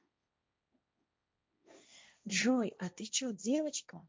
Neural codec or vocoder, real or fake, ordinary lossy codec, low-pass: codec, 16 kHz, 1.1 kbps, Voila-Tokenizer; fake; none; none